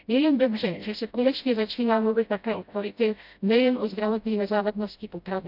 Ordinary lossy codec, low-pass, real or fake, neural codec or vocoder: none; 5.4 kHz; fake; codec, 16 kHz, 0.5 kbps, FreqCodec, smaller model